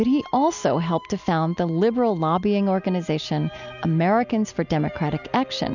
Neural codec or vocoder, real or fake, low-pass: none; real; 7.2 kHz